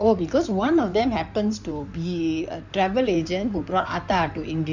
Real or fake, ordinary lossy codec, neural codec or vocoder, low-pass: fake; none; codec, 16 kHz in and 24 kHz out, 2.2 kbps, FireRedTTS-2 codec; 7.2 kHz